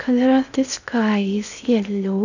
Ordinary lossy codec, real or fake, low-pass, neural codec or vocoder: none; fake; 7.2 kHz; codec, 16 kHz in and 24 kHz out, 0.6 kbps, FocalCodec, streaming, 2048 codes